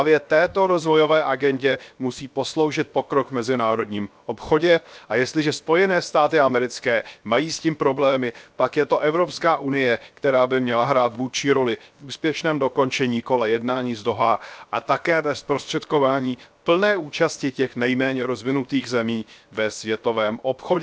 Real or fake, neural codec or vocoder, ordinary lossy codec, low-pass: fake; codec, 16 kHz, 0.7 kbps, FocalCodec; none; none